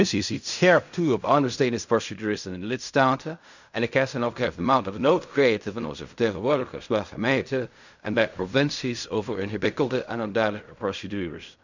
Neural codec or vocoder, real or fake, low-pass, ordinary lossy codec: codec, 16 kHz in and 24 kHz out, 0.4 kbps, LongCat-Audio-Codec, fine tuned four codebook decoder; fake; 7.2 kHz; none